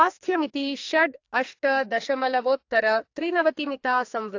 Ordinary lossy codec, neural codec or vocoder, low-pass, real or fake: AAC, 48 kbps; codec, 44.1 kHz, 2.6 kbps, SNAC; 7.2 kHz; fake